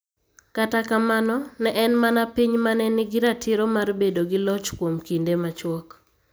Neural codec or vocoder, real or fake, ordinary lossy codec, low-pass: none; real; none; none